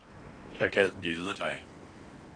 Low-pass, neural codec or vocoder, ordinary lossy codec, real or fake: 9.9 kHz; codec, 16 kHz in and 24 kHz out, 0.8 kbps, FocalCodec, streaming, 65536 codes; AAC, 32 kbps; fake